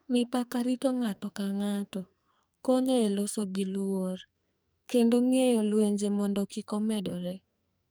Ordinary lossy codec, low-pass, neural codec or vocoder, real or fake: none; none; codec, 44.1 kHz, 2.6 kbps, SNAC; fake